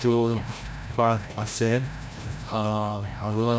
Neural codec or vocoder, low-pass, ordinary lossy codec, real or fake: codec, 16 kHz, 0.5 kbps, FreqCodec, larger model; none; none; fake